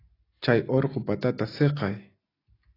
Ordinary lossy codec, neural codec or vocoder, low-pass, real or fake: AAC, 24 kbps; none; 5.4 kHz; real